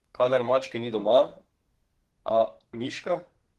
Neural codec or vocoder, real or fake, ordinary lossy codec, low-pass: codec, 32 kHz, 1.9 kbps, SNAC; fake; Opus, 16 kbps; 14.4 kHz